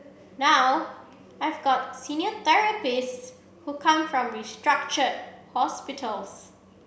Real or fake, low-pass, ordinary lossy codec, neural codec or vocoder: real; none; none; none